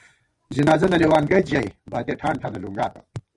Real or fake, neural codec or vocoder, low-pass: fake; vocoder, 24 kHz, 100 mel bands, Vocos; 10.8 kHz